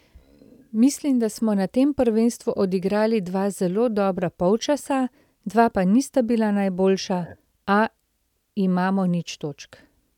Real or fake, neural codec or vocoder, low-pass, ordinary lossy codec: real; none; 19.8 kHz; none